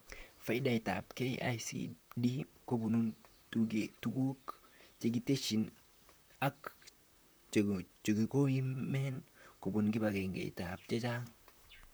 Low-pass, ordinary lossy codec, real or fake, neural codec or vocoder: none; none; fake; vocoder, 44.1 kHz, 128 mel bands, Pupu-Vocoder